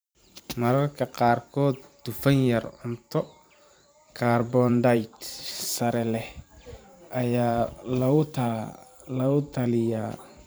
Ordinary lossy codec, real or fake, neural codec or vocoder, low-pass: none; fake; vocoder, 44.1 kHz, 128 mel bands every 512 samples, BigVGAN v2; none